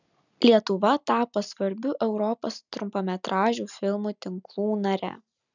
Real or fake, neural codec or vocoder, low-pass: real; none; 7.2 kHz